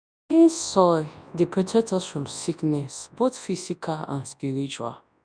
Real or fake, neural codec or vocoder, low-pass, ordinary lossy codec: fake; codec, 24 kHz, 0.9 kbps, WavTokenizer, large speech release; 9.9 kHz; none